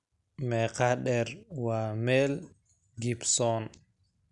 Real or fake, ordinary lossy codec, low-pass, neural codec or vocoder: real; none; 10.8 kHz; none